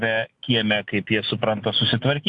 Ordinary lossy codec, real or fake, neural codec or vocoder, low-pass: AAC, 64 kbps; real; none; 10.8 kHz